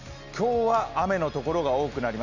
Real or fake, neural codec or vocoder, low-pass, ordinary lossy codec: real; none; 7.2 kHz; none